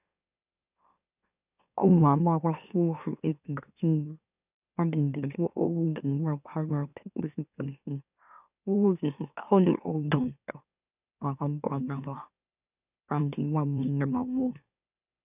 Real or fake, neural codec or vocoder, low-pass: fake; autoencoder, 44.1 kHz, a latent of 192 numbers a frame, MeloTTS; 3.6 kHz